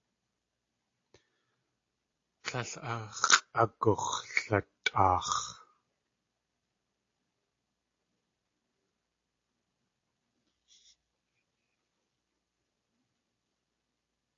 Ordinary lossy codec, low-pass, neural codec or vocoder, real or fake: AAC, 32 kbps; 7.2 kHz; none; real